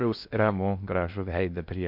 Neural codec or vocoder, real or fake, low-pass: codec, 16 kHz in and 24 kHz out, 0.6 kbps, FocalCodec, streaming, 4096 codes; fake; 5.4 kHz